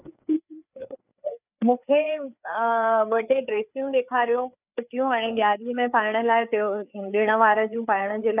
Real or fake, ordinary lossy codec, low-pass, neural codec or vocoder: fake; none; 3.6 kHz; codec, 16 kHz, 4 kbps, FreqCodec, larger model